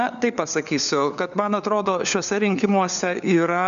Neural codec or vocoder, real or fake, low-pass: codec, 16 kHz, 4 kbps, FunCodec, trained on LibriTTS, 50 frames a second; fake; 7.2 kHz